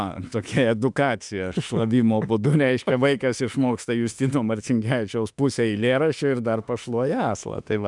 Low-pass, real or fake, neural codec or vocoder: 10.8 kHz; fake; autoencoder, 48 kHz, 32 numbers a frame, DAC-VAE, trained on Japanese speech